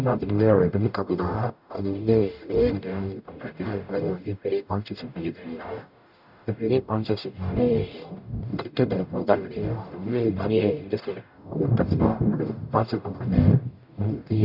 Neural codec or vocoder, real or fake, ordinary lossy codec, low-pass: codec, 44.1 kHz, 0.9 kbps, DAC; fake; none; 5.4 kHz